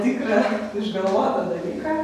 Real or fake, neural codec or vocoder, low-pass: real; none; 14.4 kHz